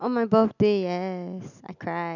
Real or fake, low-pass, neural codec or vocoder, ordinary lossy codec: real; 7.2 kHz; none; none